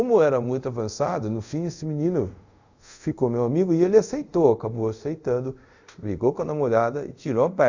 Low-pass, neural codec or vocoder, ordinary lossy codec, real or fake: 7.2 kHz; codec, 24 kHz, 0.5 kbps, DualCodec; Opus, 64 kbps; fake